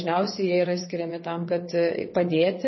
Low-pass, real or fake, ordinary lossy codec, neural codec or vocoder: 7.2 kHz; fake; MP3, 24 kbps; vocoder, 22.05 kHz, 80 mel bands, WaveNeXt